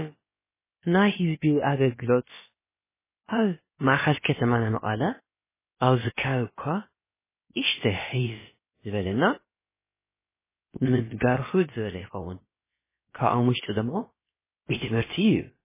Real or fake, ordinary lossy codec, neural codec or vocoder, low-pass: fake; MP3, 16 kbps; codec, 16 kHz, about 1 kbps, DyCAST, with the encoder's durations; 3.6 kHz